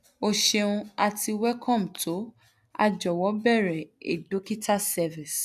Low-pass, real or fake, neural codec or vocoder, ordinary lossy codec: 14.4 kHz; real; none; none